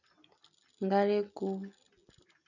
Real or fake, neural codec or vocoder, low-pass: real; none; 7.2 kHz